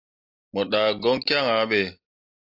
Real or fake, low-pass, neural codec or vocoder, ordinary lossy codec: real; 5.4 kHz; none; AAC, 48 kbps